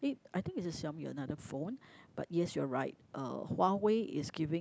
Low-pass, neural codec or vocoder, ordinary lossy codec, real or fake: none; none; none; real